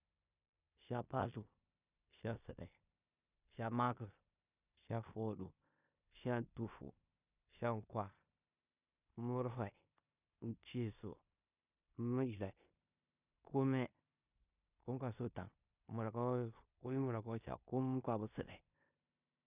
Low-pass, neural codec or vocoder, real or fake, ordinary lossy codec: 3.6 kHz; codec, 16 kHz in and 24 kHz out, 0.9 kbps, LongCat-Audio-Codec, four codebook decoder; fake; none